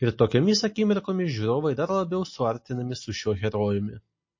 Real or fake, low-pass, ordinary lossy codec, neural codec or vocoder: real; 7.2 kHz; MP3, 32 kbps; none